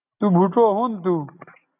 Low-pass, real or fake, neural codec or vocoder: 3.6 kHz; real; none